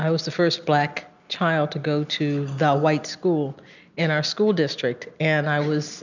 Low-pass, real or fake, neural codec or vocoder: 7.2 kHz; real; none